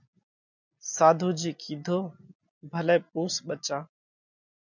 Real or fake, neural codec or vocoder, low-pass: real; none; 7.2 kHz